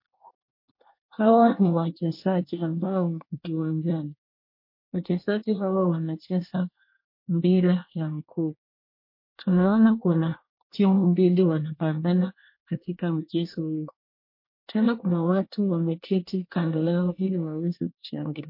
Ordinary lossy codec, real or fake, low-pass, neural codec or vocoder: MP3, 32 kbps; fake; 5.4 kHz; codec, 24 kHz, 1 kbps, SNAC